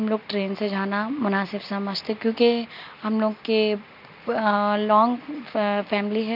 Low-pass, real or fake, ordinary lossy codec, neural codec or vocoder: 5.4 kHz; real; none; none